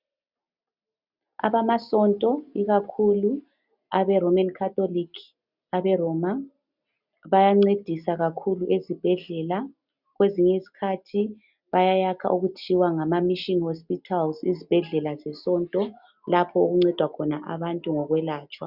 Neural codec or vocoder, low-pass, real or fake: none; 5.4 kHz; real